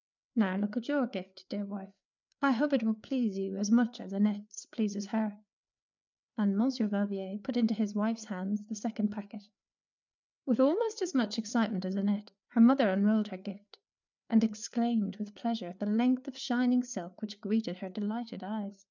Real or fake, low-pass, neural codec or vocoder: fake; 7.2 kHz; codec, 16 kHz, 4 kbps, FreqCodec, larger model